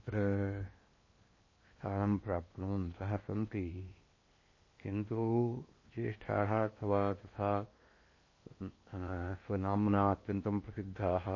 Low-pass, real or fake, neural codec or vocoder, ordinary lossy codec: 7.2 kHz; fake; codec, 16 kHz in and 24 kHz out, 0.8 kbps, FocalCodec, streaming, 65536 codes; MP3, 32 kbps